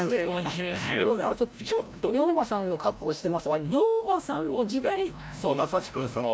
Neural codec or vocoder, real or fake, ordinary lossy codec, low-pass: codec, 16 kHz, 0.5 kbps, FreqCodec, larger model; fake; none; none